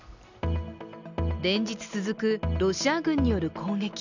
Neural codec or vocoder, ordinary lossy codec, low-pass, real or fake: none; none; 7.2 kHz; real